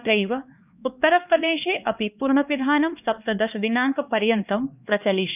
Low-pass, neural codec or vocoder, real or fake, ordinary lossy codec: 3.6 kHz; codec, 16 kHz, 2 kbps, X-Codec, HuBERT features, trained on LibriSpeech; fake; none